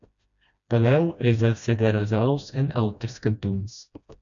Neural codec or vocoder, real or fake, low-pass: codec, 16 kHz, 1 kbps, FreqCodec, smaller model; fake; 7.2 kHz